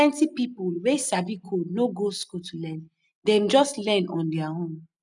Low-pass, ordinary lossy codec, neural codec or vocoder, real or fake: 10.8 kHz; none; none; real